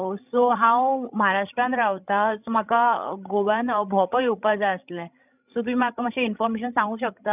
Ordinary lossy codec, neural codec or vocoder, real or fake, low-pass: none; codec, 16 kHz, 16 kbps, FreqCodec, larger model; fake; 3.6 kHz